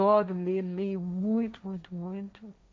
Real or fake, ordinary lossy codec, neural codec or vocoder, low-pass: fake; none; codec, 16 kHz, 1.1 kbps, Voila-Tokenizer; none